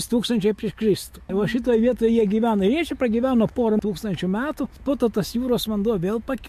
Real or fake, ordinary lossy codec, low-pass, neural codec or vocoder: fake; MP3, 64 kbps; 14.4 kHz; autoencoder, 48 kHz, 128 numbers a frame, DAC-VAE, trained on Japanese speech